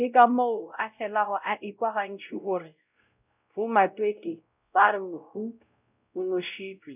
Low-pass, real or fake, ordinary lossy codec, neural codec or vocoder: 3.6 kHz; fake; none; codec, 16 kHz, 0.5 kbps, X-Codec, WavLM features, trained on Multilingual LibriSpeech